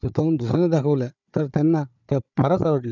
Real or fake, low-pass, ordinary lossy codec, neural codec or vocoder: fake; 7.2 kHz; none; codec, 16 kHz, 4 kbps, FunCodec, trained on Chinese and English, 50 frames a second